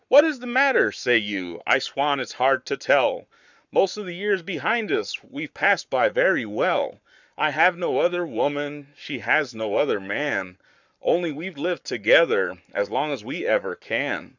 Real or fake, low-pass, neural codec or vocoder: fake; 7.2 kHz; codec, 44.1 kHz, 7.8 kbps, Pupu-Codec